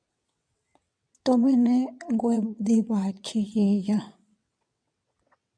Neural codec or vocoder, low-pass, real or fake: vocoder, 22.05 kHz, 80 mel bands, WaveNeXt; 9.9 kHz; fake